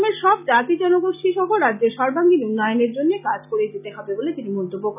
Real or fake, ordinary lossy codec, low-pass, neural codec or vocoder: real; none; 3.6 kHz; none